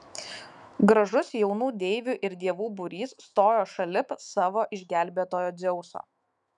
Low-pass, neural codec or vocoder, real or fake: 10.8 kHz; autoencoder, 48 kHz, 128 numbers a frame, DAC-VAE, trained on Japanese speech; fake